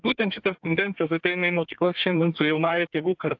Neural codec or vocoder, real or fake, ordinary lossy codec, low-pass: codec, 32 kHz, 1.9 kbps, SNAC; fake; AAC, 48 kbps; 7.2 kHz